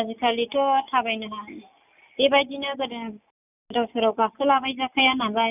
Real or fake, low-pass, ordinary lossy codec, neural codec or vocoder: real; 3.6 kHz; none; none